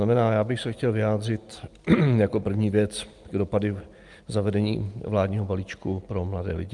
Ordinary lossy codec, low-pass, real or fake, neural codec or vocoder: Opus, 32 kbps; 10.8 kHz; real; none